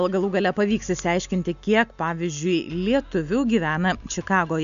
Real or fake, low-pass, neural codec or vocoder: real; 7.2 kHz; none